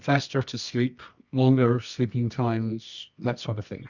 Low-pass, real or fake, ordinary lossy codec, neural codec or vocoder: 7.2 kHz; fake; Opus, 64 kbps; codec, 24 kHz, 0.9 kbps, WavTokenizer, medium music audio release